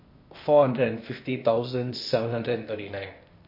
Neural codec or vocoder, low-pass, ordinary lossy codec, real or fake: codec, 16 kHz, 0.8 kbps, ZipCodec; 5.4 kHz; MP3, 32 kbps; fake